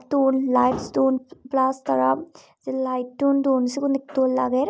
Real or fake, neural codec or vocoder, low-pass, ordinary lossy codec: real; none; none; none